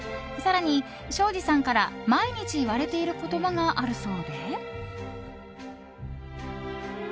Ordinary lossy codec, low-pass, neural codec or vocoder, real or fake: none; none; none; real